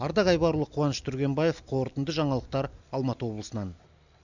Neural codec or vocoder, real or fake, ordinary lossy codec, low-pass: none; real; none; 7.2 kHz